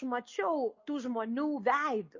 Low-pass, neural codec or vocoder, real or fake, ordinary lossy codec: 7.2 kHz; none; real; MP3, 32 kbps